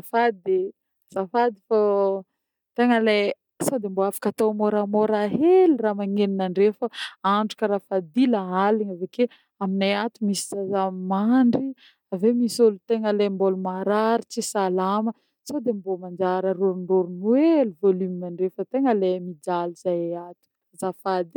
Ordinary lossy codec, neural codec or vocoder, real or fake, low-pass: none; none; real; 19.8 kHz